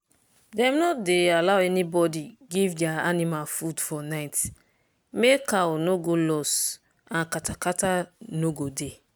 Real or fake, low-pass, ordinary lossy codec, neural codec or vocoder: real; none; none; none